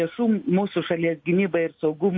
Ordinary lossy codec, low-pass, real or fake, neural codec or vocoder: MP3, 32 kbps; 7.2 kHz; real; none